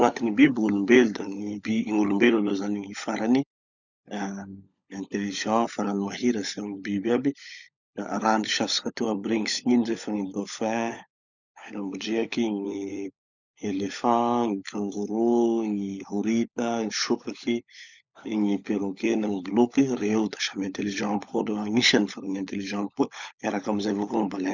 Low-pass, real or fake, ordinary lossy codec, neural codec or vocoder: 7.2 kHz; fake; none; codec, 16 kHz, 16 kbps, FunCodec, trained on LibriTTS, 50 frames a second